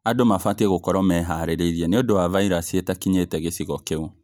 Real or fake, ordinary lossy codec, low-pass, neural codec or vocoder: real; none; none; none